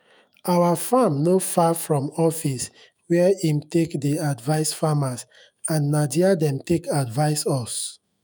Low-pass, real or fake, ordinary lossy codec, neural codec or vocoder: none; fake; none; autoencoder, 48 kHz, 128 numbers a frame, DAC-VAE, trained on Japanese speech